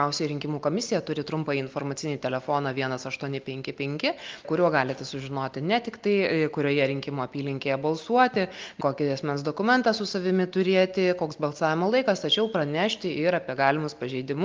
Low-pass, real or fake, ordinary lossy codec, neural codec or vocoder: 7.2 kHz; real; Opus, 24 kbps; none